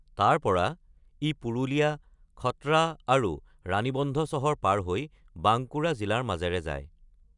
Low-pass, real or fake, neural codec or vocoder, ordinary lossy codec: 10.8 kHz; real; none; none